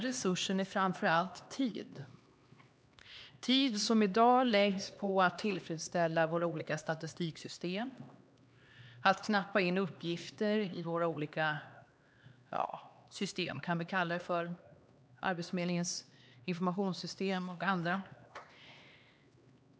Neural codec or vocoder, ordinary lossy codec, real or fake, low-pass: codec, 16 kHz, 2 kbps, X-Codec, HuBERT features, trained on LibriSpeech; none; fake; none